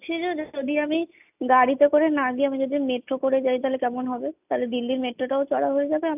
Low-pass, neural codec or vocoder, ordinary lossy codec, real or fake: 3.6 kHz; none; none; real